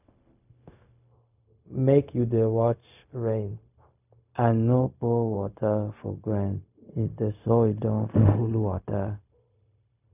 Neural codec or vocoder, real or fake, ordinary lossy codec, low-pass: codec, 16 kHz, 0.4 kbps, LongCat-Audio-Codec; fake; none; 3.6 kHz